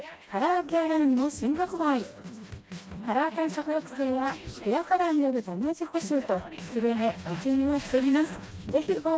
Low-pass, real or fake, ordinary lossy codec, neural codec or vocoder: none; fake; none; codec, 16 kHz, 1 kbps, FreqCodec, smaller model